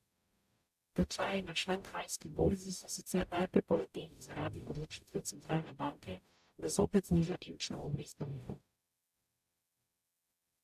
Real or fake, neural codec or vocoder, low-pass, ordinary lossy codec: fake; codec, 44.1 kHz, 0.9 kbps, DAC; 14.4 kHz; AAC, 96 kbps